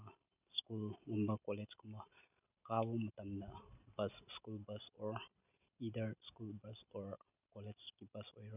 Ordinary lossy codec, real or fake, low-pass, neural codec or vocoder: none; real; 3.6 kHz; none